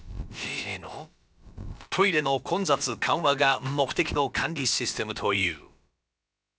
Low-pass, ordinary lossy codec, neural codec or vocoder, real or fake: none; none; codec, 16 kHz, about 1 kbps, DyCAST, with the encoder's durations; fake